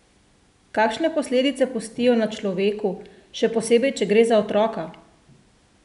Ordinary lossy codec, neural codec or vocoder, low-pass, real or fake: none; none; 10.8 kHz; real